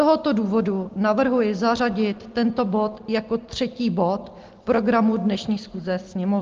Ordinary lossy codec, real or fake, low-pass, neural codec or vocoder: Opus, 16 kbps; real; 7.2 kHz; none